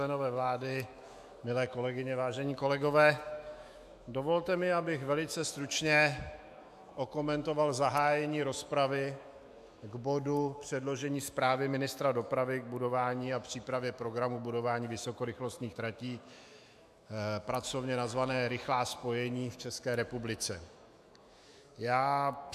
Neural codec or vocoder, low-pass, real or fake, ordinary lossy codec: autoencoder, 48 kHz, 128 numbers a frame, DAC-VAE, trained on Japanese speech; 14.4 kHz; fake; AAC, 96 kbps